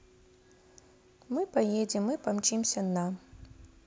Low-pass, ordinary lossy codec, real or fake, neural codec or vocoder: none; none; real; none